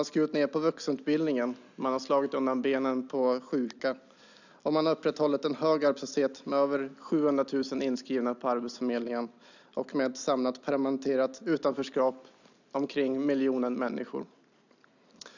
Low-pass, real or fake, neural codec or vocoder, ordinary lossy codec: 7.2 kHz; real; none; none